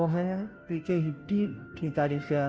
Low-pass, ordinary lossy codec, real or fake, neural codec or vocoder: none; none; fake; codec, 16 kHz, 0.5 kbps, FunCodec, trained on Chinese and English, 25 frames a second